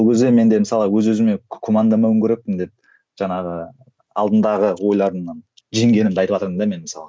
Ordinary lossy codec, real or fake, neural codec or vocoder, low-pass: none; real; none; none